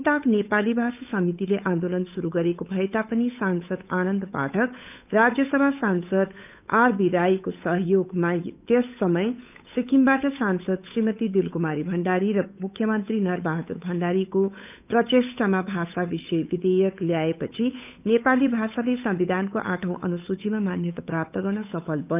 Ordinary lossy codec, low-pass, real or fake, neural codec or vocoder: none; 3.6 kHz; fake; codec, 16 kHz, 8 kbps, FunCodec, trained on Chinese and English, 25 frames a second